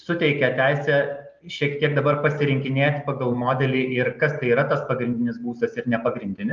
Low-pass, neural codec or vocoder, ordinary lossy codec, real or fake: 7.2 kHz; none; Opus, 24 kbps; real